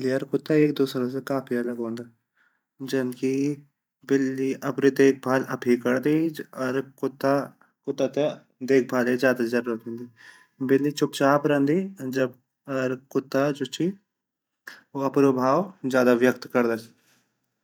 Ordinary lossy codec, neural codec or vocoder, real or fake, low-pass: none; vocoder, 44.1 kHz, 128 mel bands every 512 samples, BigVGAN v2; fake; 19.8 kHz